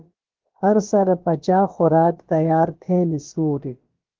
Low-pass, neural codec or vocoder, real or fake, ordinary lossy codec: 7.2 kHz; codec, 16 kHz, about 1 kbps, DyCAST, with the encoder's durations; fake; Opus, 16 kbps